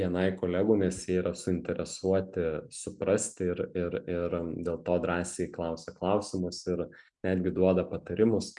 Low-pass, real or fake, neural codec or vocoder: 10.8 kHz; real; none